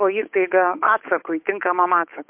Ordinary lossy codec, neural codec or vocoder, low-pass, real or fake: MP3, 32 kbps; codec, 16 kHz, 8 kbps, FunCodec, trained on Chinese and English, 25 frames a second; 3.6 kHz; fake